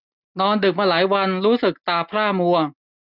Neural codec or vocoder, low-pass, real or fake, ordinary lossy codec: none; 5.4 kHz; real; none